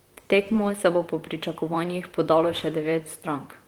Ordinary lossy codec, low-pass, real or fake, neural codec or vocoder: Opus, 24 kbps; 19.8 kHz; fake; vocoder, 44.1 kHz, 128 mel bands, Pupu-Vocoder